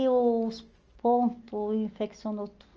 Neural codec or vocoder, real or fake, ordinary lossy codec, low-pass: none; real; Opus, 24 kbps; 7.2 kHz